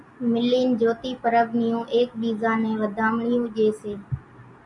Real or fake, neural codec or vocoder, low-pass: real; none; 10.8 kHz